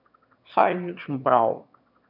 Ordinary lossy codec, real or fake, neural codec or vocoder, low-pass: none; fake; autoencoder, 22.05 kHz, a latent of 192 numbers a frame, VITS, trained on one speaker; 5.4 kHz